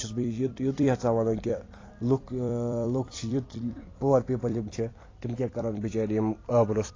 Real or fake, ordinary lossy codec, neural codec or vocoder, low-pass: fake; AAC, 32 kbps; vocoder, 44.1 kHz, 128 mel bands every 256 samples, BigVGAN v2; 7.2 kHz